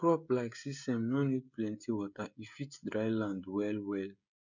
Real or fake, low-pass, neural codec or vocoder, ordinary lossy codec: fake; 7.2 kHz; codec, 16 kHz, 16 kbps, FreqCodec, smaller model; none